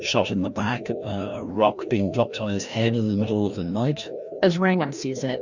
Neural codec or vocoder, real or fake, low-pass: codec, 16 kHz, 1 kbps, FreqCodec, larger model; fake; 7.2 kHz